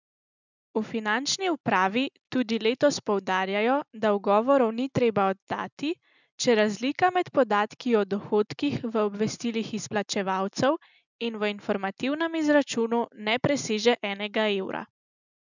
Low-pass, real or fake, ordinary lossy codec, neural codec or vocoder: 7.2 kHz; real; none; none